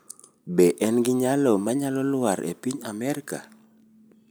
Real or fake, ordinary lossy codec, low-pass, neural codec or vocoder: real; none; none; none